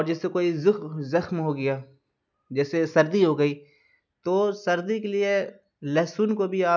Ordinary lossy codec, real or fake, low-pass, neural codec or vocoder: none; real; 7.2 kHz; none